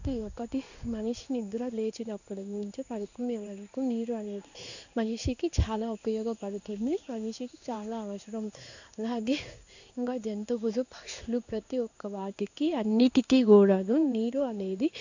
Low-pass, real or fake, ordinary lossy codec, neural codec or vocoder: 7.2 kHz; fake; none; codec, 16 kHz in and 24 kHz out, 1 kbps, XY-Tokenizer